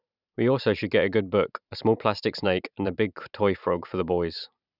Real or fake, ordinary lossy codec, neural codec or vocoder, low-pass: fake; none; vocoder, 44.1 kHz, 128 mel bands every 512 samples, BigVGAN v2; 5.4 kHz